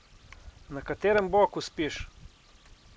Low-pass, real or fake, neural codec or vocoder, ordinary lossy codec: none; real; none; none